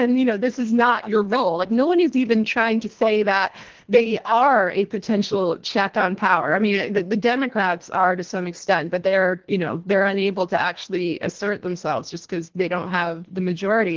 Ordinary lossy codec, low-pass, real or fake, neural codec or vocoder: Opus, 16 kbps; 7.2 kHz; fake; codec, 24 kHz, 1.5 kbps, HILCodec